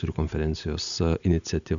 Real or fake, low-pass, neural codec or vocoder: real; 7.2 kHz; none